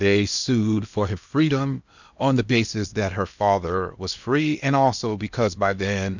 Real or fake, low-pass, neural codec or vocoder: fake; 7.2 kHz; codec, 16 kHz in and 24 kHz out, 0.8 kbps, FocalCodec, streaming, 65536 codes